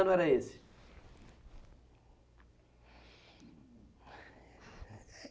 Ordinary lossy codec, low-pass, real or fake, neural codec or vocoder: none; none; real; none